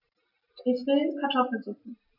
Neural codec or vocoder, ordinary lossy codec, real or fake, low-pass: none; none; real; 5.4 kHz